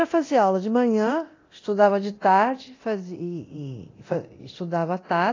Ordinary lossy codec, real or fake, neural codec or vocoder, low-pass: AAC, 32 kbps; fake; codec, 24 kHz, 0.9 kbps, DualCodec; 7.2 kHz